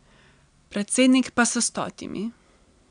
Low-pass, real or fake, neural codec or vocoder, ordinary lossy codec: 9.9 kHz; real; none; none